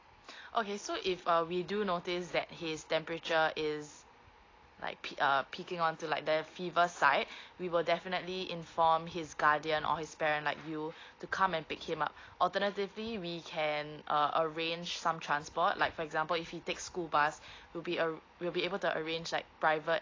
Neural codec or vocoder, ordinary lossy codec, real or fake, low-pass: none; AAC, 32 kbps; real; 7.2 kHz